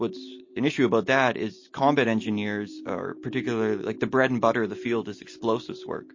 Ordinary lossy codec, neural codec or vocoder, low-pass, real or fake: MP3, 32 kbps; none; 7.2 kHz; real